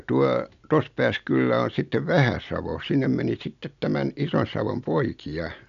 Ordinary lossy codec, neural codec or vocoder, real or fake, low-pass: none; none; real; 7.2 kHz